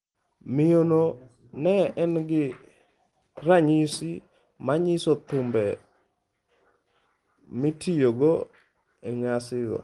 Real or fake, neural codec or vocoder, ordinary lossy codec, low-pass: real; none; Opus, 24 kbps; 10.8 kHz